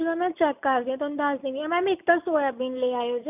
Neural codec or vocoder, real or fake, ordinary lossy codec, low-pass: none; real; none; 3.6 kHz